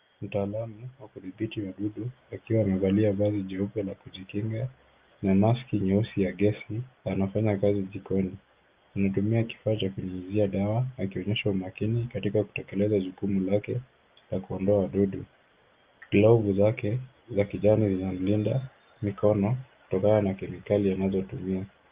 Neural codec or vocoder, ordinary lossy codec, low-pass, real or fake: none; Opus, 24 kbps; 3.6 kHz; real